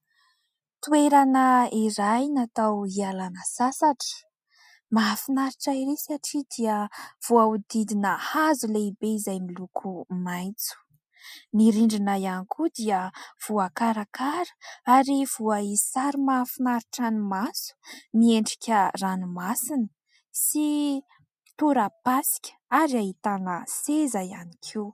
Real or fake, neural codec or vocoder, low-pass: real; none; 14.4 kHz